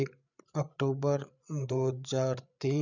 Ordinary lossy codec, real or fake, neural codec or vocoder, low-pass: none; fake; codec, 16 kHz, 8 kbps, FreqCodec, larger model; 7.2 kHz